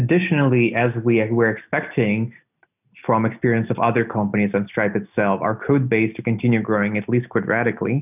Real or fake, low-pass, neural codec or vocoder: real; 3.6 kHz; none